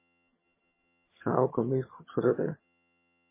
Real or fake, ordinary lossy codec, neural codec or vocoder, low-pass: fake; MP3, 16 kbps; vocoder, 22.05 kHz, 80 mel bands, HiFi-GAN; 3.6 kHz